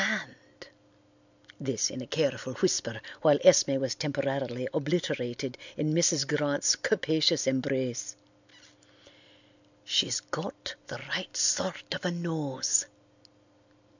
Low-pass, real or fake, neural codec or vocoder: 7.2 kHz; real; none